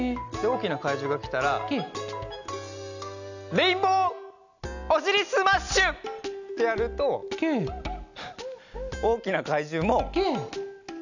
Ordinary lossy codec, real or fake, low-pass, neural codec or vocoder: none; real; 7.2 kHz; none